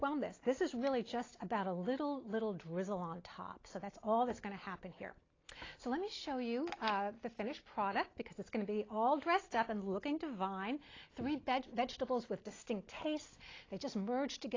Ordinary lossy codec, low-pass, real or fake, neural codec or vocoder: AAC, 32 kbps; 7.2 kHz; real; none